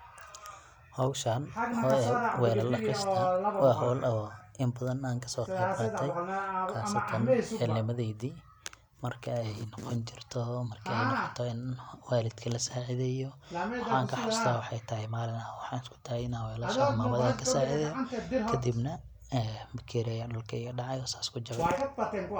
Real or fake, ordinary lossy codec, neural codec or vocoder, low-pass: real; none; none; 19.8 kHz